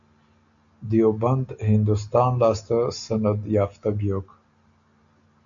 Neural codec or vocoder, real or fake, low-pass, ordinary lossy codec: none; real; 7.2 kHz; AAC, 64 kbps